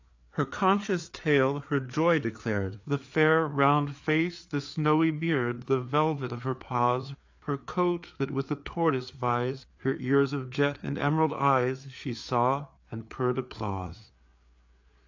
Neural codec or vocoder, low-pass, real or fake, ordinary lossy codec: codec, 16 kHz, 4 kbps, FreqCodec, larger model; 7.2 kHz; fake; AAC, 48 kbps